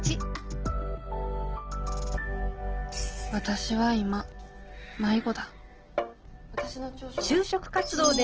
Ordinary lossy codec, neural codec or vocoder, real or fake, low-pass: Opus, 16 kbps; none; real; 7.2 kHz